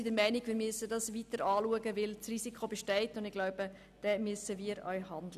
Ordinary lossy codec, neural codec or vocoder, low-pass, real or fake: none; none; 14.4 kHz; real